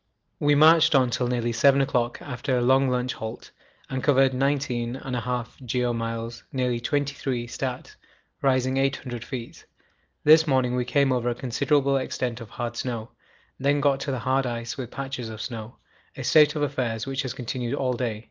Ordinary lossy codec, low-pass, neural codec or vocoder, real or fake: Opus, 32 kbps; 7.2 kHz; none; real